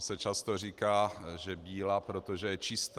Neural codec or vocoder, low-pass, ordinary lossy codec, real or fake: none; 14.4 kHz; Opus, 24 kbps; real